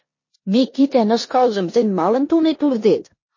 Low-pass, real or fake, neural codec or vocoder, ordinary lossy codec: 7.2 kHz; fake; codec, 16 kHz in and 24 kHz out, 0.9 kbps, LongCat-Audio-Codec, four codebook decoder; MP3, 32 kbps